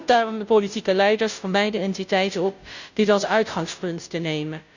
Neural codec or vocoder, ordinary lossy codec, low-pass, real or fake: codec, 16 kHz, 0.5 kbps, FunCodec, trained on Chinese and English, 25 frames a second; none; 7.2 kHz; fake